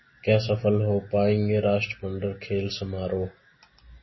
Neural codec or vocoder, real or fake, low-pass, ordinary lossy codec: none; real; 7.2 kHz; MP3, 24 kbps